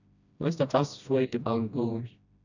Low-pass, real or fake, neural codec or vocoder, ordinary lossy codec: 7.2 kHz; fake; codec, 16 kHz, 1 kbps, FreqCodec, smaller model; none